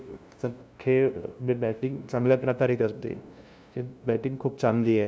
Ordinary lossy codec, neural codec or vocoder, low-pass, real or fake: none; codec, 16 kHz, 0.5 kbps, FunCodec, trained on LibriTTS, 25 frames a second; none; fake